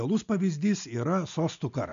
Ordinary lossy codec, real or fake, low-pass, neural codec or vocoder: MP3, 64 kbps; real; 7.2 kHz; none